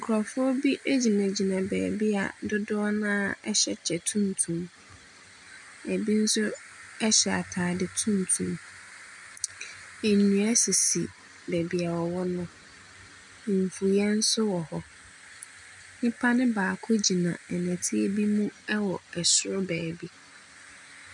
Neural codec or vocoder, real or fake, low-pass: none; real; 10.8 kHz